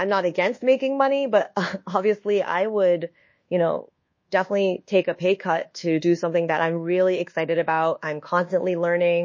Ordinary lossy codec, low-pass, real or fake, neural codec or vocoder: MP3, 32 kbps; 7.2 kHz; fake; codec, 24 kHz, 1.2 kbps, DualCodec